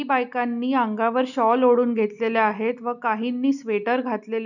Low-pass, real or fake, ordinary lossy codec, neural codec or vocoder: 7.2 kHz; real; none; none